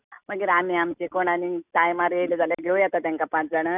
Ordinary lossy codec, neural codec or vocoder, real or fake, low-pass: none; none; real; 3.6 kHz